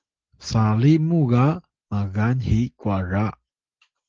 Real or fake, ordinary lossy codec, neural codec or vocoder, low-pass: real; Opus, 16 kbps; none; 7.2 kHz